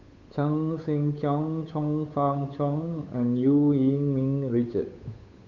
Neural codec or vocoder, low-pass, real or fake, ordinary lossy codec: codec, 16 kHz, 8 kbps, FunCodec, trained on Chinese and English, 25 frames a second; 7.2 kHz; fake; none